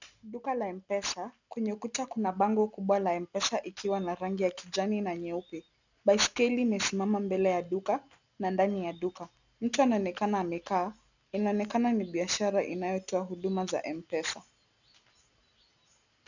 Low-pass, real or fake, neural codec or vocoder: 7.2 kHz; real; none